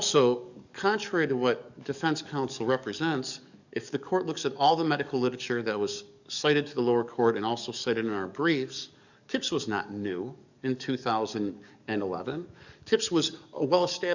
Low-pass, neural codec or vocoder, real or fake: 7.2 kHz; codec, 44.1 kHz, 7.8 kbps, DAC; fake